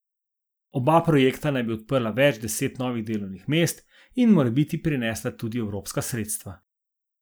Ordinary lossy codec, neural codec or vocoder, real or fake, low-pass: none; none; real; none